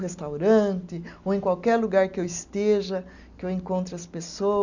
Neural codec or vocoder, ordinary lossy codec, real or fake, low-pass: none; none; real; 7.2 kHz